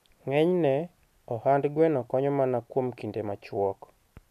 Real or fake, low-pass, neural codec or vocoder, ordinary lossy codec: real; 14.4 kHz; none; none